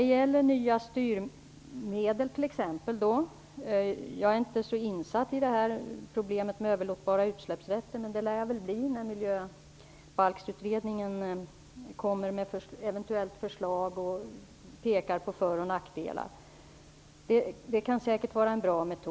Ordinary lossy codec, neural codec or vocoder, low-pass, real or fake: none; none; none; real